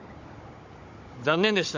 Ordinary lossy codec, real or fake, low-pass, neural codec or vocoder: MP3, 48 kbps; fake; 7.2 kHz; codec, 16 kHz, 16 kbps, FunCodec, trained on Chinese and English, 50 frames a second